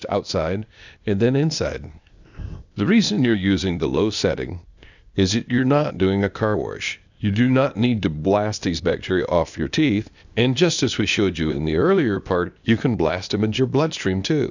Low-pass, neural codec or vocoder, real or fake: 7.2 kHz; codec, 16 kHz, 0.8 kbps, ZipCodec; fake